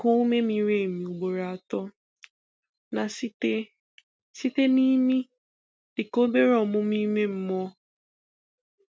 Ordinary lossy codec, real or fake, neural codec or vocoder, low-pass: none; real; none; none